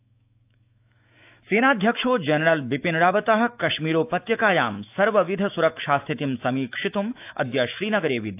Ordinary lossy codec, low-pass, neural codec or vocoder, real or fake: AAC, 32 kbps; 3.6 kHz; autoencoder, 48 kHz, 128 numbers a frame, DAC-VAE, trained on Japanese speech; fake